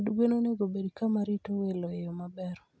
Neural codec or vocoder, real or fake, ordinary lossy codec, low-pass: none; real; none; none